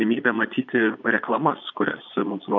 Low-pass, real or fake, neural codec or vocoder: 7.2 kHz; fake; vocoder, 22.05 kHz, 80 mel bands, Vocos